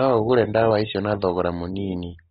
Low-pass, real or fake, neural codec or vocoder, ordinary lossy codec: 7.2 kHz; real; none; AAC, 16 kbps